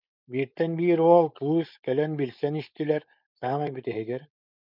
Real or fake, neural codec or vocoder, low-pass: fake; codec, 16 kHz, 4.8 kbps, FACodec; 5.4 kHz